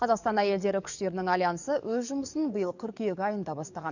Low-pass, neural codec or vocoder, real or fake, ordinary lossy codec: 7.2 kHz; codec, 44.1 kHz, 7.8 kbps, DAC; fake; none